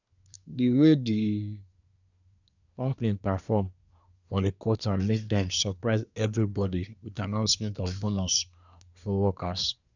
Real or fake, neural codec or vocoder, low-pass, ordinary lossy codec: fake; codec, 24 kHz, 1 kbps, SNAC; 7.2 kHz; none